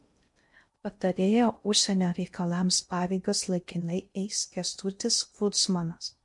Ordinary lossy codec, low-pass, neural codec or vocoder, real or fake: MP3, 64 kbps; 10.8 kHz; codec, 16 kHz in and 24 kHz out, 0.6 kbps, FocalCodec, streaming, 2048 codes; fake